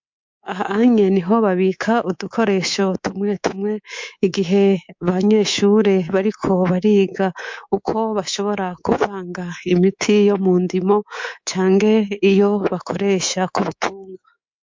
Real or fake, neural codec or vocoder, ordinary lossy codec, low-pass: fake; codec, 24 kHz, 3.1 kbps, DualCodec; MP3, 48 kbps; 7.2 kHz